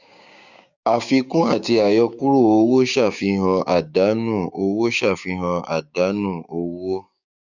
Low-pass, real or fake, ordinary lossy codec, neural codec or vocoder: 7.2 kHz; fake; none; codec, 16 kHz, 6 kbps, DAC